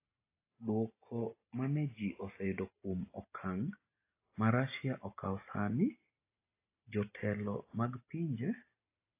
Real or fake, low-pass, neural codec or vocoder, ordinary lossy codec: real; 3.6 kHz; none; AAC, 24 kbps